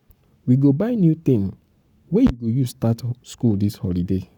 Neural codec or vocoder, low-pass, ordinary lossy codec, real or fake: codec, 44.1 kHz, 7.8 kbps, Pupu-Codec; 19.8 kHz; none; fake